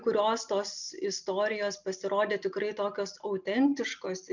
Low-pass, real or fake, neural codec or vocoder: 7.2 kHz; real; none